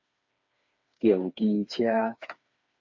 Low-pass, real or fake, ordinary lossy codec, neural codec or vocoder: 7.2 kHz; fake; MP3, 48 kbps; codec, 16 kHz, 4 kbps, FreqCodec, smaller model